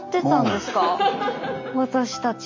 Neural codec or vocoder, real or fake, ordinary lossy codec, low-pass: none; real; MP3, 64 kbps; 7.2 kHz